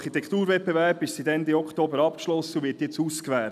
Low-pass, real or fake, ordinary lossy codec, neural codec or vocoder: 14.4 kHz; real; none; none